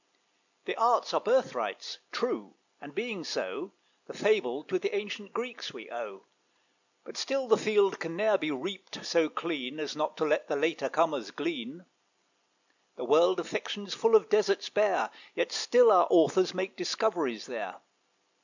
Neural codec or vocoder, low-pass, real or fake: none; 7.2 kHz; real